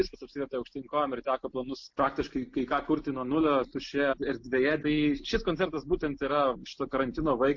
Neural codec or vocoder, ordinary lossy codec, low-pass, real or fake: none; MP3, 48 kbps; 7.2 kHz; real